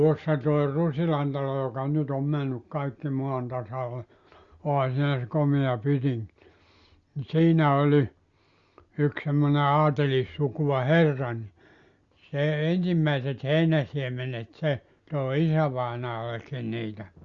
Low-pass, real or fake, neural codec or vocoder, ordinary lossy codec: 7.2 kHz; real; none; Opus, 64 kbps